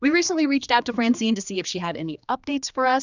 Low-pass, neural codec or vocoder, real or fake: 7.2 kHz; codec, 16 kHz, 2 kbps, X-Codec, HuBERT features, trained on general audio; fake